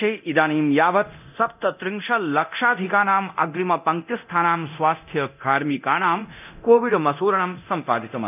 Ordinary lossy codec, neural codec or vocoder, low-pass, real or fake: none; codec, 24 kHz, 0.9 kbps, DualCodec; 3.6 kHz; fake